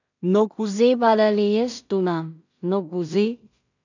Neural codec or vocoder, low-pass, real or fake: codec, 16 kHz in and 24 kHz out, 0.4 kbps, LongCat-Audio-Codec, two codebook decoder; 7.2 kHz; fake